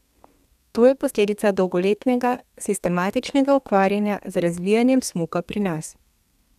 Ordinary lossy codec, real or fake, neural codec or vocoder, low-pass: none; fake; codec, 32 kHz, 1.9 kbps, SNAC; 14.4 kHz